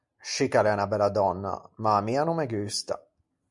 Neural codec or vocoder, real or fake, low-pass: none; real; 10.8 kHz